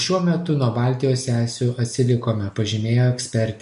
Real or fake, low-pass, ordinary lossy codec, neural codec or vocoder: real; 10.8 kHz; MP3, 48 kbps; none